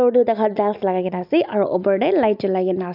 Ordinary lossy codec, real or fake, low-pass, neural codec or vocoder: none; real; 5.4 kHz; none